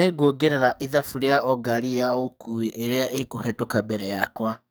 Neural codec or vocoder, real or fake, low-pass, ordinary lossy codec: codec, 44.1 kHz, 2.6 kbps, SNAC; fake; none; none